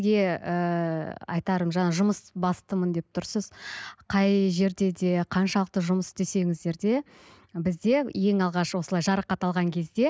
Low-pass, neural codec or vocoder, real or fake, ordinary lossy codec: none; none; real; none